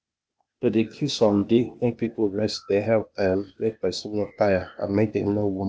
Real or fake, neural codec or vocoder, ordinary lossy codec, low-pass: fake; codec, 16 kHz, 0.8 kbps, ZipCodec; none; none